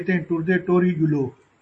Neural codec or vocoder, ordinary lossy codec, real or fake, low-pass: none; MP3, 32 kbps; real; 10.8 kHz